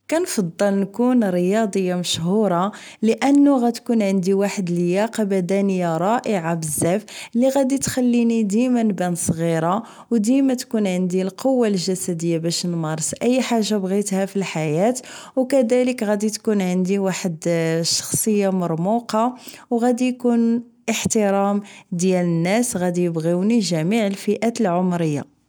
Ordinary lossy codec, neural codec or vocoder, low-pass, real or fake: none; none; none; real